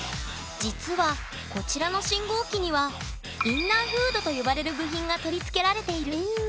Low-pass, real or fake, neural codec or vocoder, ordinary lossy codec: none; real; none; none